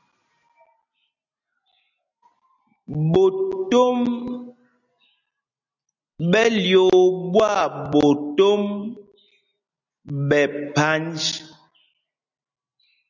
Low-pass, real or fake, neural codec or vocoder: 7.2 kHz; real; none